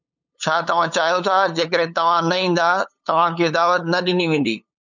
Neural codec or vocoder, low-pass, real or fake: codec, 16 kHz, 8 kbps, FunCodec, trained on LibriTTS, 25 frames a second; 7.2 kHz; fake